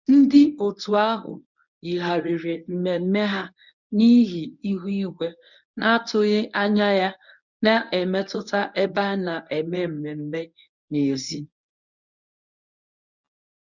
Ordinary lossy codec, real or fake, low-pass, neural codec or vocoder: none; fake; 7.2 kHz; codec, 24 kHz, 0.9 kbps, WavTokenizer, medium speech release version 1